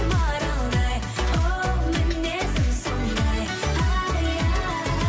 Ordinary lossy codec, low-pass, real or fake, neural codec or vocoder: none; none; real; none